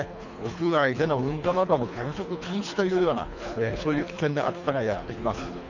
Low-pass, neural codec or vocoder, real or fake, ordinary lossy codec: 7.2 kHz; codec, 24 kHz, 3 kbps, HILCodec; fake; none